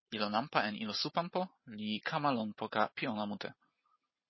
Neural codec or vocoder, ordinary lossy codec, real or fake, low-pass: none; MP3, 24 kbps; real; 7.2 kHz